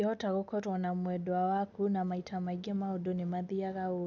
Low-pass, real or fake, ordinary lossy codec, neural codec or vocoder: 7.2 kHz; real; none; none